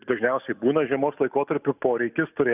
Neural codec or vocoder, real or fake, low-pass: none; real; 3.6 kHz